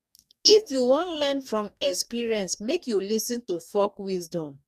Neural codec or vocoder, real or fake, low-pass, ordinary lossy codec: codec, 44.1 kHz, 2.6 kbps, DAC; fake; 14.4 kHz; none